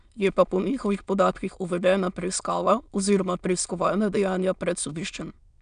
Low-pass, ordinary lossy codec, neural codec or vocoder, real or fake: 9.9 kHz; none; autoencoder, 22.05 kHz, a latent of 192 numbers a frame, VITS, trained on many speakers; fake